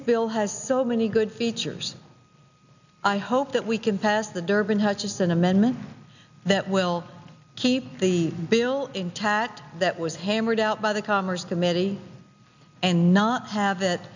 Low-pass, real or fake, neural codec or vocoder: 7.2 kHz; real; none